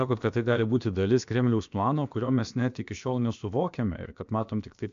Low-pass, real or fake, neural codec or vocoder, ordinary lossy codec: 7.2 kHz; fake; codec, 16 kHz, about 1 kbps, DyCAST, with the encoder's durations; AAC, 64 kbps